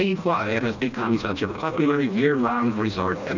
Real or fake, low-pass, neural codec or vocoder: fake; 7.2 kHz; codec, 16 kHz, 1 kbps, FreqCodec, smaller model